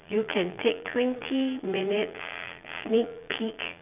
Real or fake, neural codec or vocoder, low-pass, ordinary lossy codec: fake; vocoder, 22.05 kHz, 80 mel bands, Vocos; 3.6 kHz; none